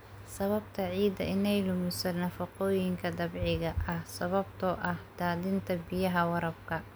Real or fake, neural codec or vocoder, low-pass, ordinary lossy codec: real; none; none; none